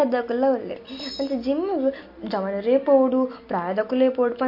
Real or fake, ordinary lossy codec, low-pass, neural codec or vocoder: real; MP3, 32 kbps; 5.4 kHz; none